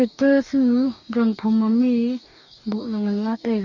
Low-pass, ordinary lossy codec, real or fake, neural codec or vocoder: 7.2 kHz; none; fake; codec, 44.1 kHz, 2.6 kbps, DAC